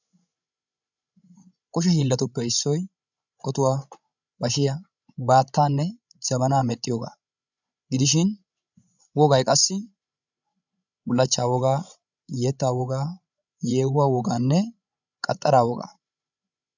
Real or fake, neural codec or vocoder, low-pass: fake; codec, 16 kHz, 16 kbps, FreqCodec, larger model; 7.2 kHz